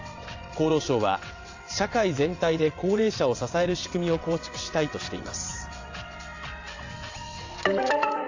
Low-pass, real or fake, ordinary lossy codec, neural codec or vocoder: 7.2 kHz; fake; AAC, 48 kbps; vocoder, 22.05 kHz, 80 mel bands, WaveNeXt